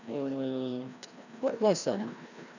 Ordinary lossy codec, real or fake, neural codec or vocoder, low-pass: none; fake; codec, 16 kHz, 1 kbps, FreqCodec, larger model; 7.2 kHz